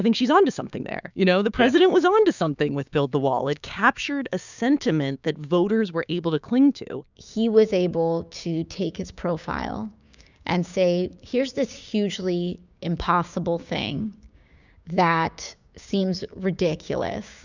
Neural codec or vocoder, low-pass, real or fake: codec, 16 kHz, 6 kbps, DAC; 7.2 kHz; fake